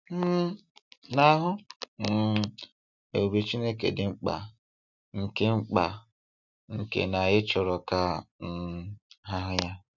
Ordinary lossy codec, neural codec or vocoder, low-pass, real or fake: none; none; 7.2 kHz; real